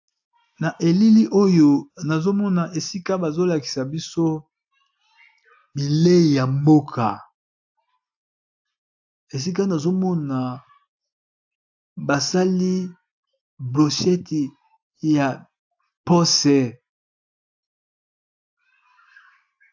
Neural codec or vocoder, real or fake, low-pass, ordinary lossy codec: none; real; 7.2 kHz; AAC, 48 kbps